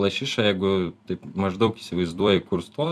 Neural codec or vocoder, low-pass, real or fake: vocoder, 44.1 kHz, 128 mel bands every 256 samples, BigVGAN v2; 14.4 kHz; fake